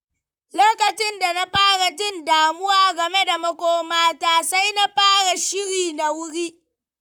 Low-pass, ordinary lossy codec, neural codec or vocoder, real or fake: 19.8 kHz; none; vocoder, 44.1 kHz, 128 mel bands, Pupu-Vocoder; fake